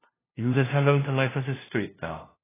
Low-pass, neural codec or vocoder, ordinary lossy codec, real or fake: 3.6 kHz; codec, 16 kHz, 0.5 kbps, FunCodec, trained on LibriTTS, 25 frames a second; AAC, 16 kbps; fake